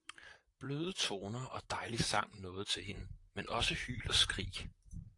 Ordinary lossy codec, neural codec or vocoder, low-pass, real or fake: AAC, 48 kbps; vocoder, 44.1 kHz, 128 mel bands, Pupu-Vocoder; 10.8 kHz; fake